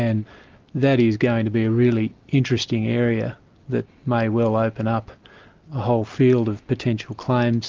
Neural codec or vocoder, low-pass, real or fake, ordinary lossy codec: none; 7.2 kHz; real; Opus, 24 kbps